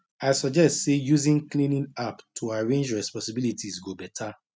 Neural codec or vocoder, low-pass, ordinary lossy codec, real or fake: none; none; none; real